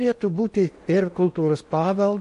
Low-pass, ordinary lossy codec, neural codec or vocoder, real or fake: 10.8 kHz; MP3, 48 kbps; codec, 16 kHz in and 24 kHz out, 0.8 kbps, FocalCodec, streaming, 65536 codes; fake